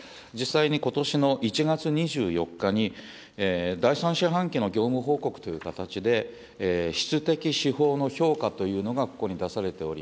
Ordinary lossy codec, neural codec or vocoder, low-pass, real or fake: none; none; none; real